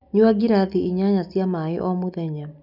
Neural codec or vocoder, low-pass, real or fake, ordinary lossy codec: none; 5.4 kHz; real; none